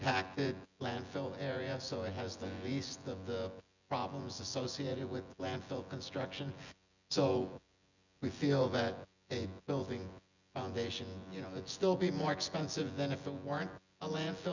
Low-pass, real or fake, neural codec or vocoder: 7.2 kHz; fake; vocoder, 24 kHz, 100 mel bands, Vocos